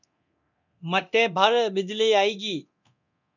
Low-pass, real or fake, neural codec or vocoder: 7.2 kHz; fake; codec, 24 kHz, 0.9 kbps, DualCodec